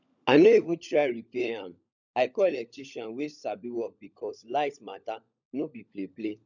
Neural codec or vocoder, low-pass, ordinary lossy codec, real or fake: codec, 16 kHz, 16 kbps, FunCodec, trained on LibriTTS, 50 frames a second; 7.2 kHz; none; fake